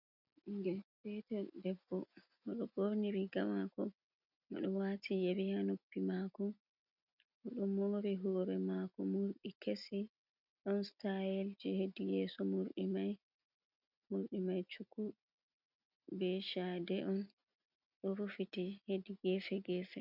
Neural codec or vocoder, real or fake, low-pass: none; real; 5.4 kHz